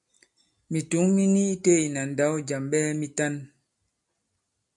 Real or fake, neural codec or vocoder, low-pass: real; none; 10.8 kHz